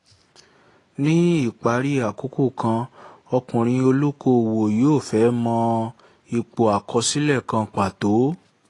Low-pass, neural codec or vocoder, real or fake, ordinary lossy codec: 10.8 kHz; none; real; AAC, 32 kbps